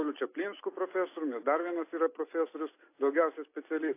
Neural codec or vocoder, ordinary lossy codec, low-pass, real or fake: none; AAC, 24 kbps; 3.6 kHz; real